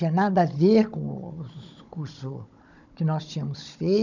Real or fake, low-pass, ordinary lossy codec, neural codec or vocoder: fake; 7.2 kHz; none; codec, 16 kHz, 16 kbps, FunCodec, trained on Chinese and English, 50 frames a second